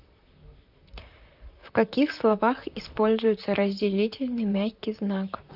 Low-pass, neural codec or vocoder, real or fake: 5.4 kHz; vocoder, 44.1 kHz, 128 mel bands, Pupu-Vocoder; fake